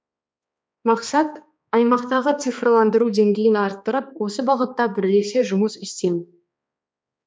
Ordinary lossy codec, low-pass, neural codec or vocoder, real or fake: none; none; codec, 16 kHz, 2 kbps, X-Codec, HuBERT features, trained on balanced general audio; fake